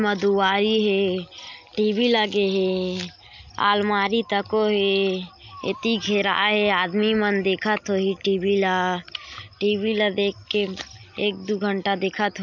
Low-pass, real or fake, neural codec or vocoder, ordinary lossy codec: 7.2 kHz; real; none; none